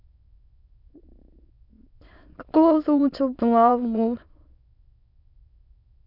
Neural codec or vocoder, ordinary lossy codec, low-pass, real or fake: autoencoder, 22.05 kHz, a latent of 192 numbers a frame, VITS, trained on many speakers; none; 5.4 kHz; fake